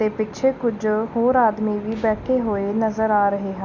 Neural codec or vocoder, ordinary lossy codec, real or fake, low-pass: none; none; real; 7.2 kHz